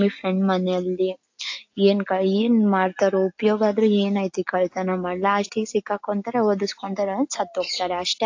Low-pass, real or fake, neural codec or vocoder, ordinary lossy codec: 7.2 kHz; real; none; AAC, 48 kbps